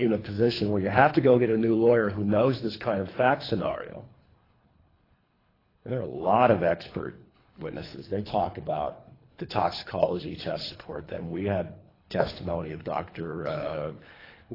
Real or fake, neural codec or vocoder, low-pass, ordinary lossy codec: fake; codec, 24 kHz, 3 kbps, HILCodec; 5.4 kHz; AAC, 24 kbps